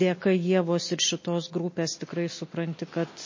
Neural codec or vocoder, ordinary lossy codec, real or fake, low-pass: none; MP3, 32 kbps; real; 7.2 kHz